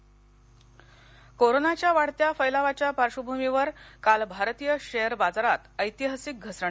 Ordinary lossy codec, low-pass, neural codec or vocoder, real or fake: none; none; none; real